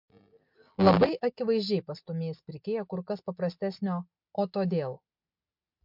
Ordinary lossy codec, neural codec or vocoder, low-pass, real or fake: MP3, 48 kbps; none; 5.4 kHz; real